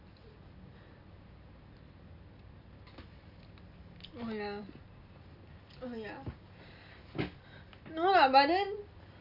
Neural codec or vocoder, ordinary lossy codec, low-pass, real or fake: none; none; 5.4 kHz; real